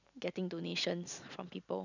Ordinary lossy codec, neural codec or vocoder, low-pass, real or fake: none; none; 7.2 kHz; real